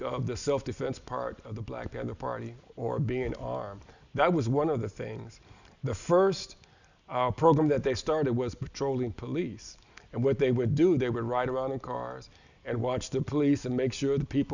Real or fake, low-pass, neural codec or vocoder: real; 7.2 kHz; none